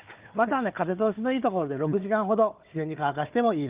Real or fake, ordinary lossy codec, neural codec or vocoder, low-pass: fake; Opus, 32 kbps; codec, 16 kHz, 4 kbps, FunCodec, trained on Chinese and English, 50 frames a second; 3.6 kHz